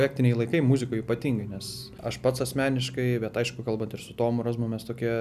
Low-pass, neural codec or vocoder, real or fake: 14.4 kHz; none; real